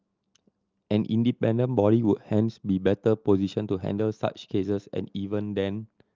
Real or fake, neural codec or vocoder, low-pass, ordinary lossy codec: real; none; 7.2 kHz; Opus, 32 kbps